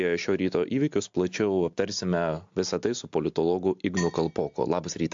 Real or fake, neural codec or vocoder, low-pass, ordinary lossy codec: real; none; 7.2 kHz; AAC, 64 kbps